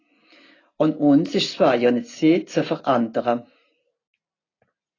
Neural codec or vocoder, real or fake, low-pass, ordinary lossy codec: none; real; 7.2 kHz; AAC, 32 kbps